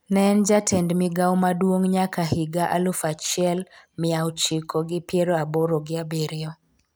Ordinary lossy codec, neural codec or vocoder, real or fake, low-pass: none; none; real; none